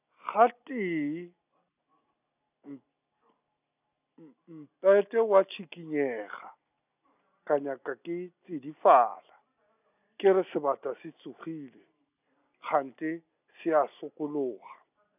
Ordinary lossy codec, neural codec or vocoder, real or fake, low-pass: none; none; real; 3.6 kHz